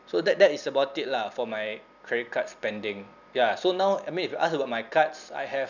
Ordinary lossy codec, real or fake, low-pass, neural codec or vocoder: none; real; 7.2 kHz; none